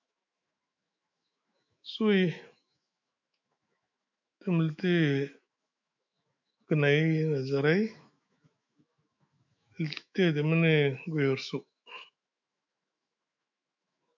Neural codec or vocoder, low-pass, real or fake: autoencoder, 48 kHz, 128 numbers a frame, DAC-VAE, trained on Japanese speech; 7.2 kHz; fake